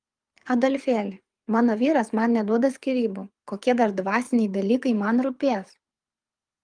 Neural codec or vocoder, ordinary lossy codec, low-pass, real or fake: codec, 24 kHz, 6 kbps, HILCodec; Opus, 32 kbps; 9.9 kHz; fake